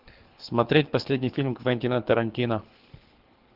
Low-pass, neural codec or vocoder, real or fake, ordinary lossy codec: 5.4 kHz; codec, 24 kHz, 6 kbps, HILCodec; fake; Opus, 16 kbps